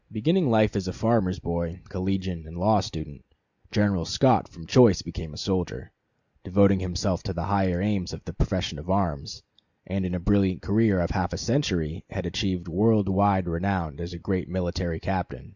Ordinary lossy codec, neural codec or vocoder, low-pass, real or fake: Opus, 64 kbps; none; 7.2 kHz; real